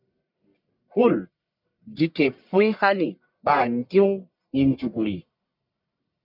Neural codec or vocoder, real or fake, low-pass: codec, 44.1 kHz, 1.7 kbps, Pupu-Codec; fake; 5.4 kHz